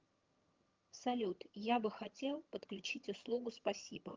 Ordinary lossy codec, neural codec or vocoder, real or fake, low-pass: Opus, 24 kbps; vocoder, 22.05 kHz, 80 mel bands, HiFi-GAN; fake; 7.2 kHz